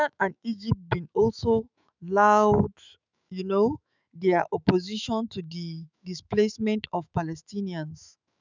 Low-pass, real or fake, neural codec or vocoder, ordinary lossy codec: 7.2 kHz; fake; autoencoder, 48 kHz, 128 numbers a frame, DAC-VAE, trained on Japanese speech; none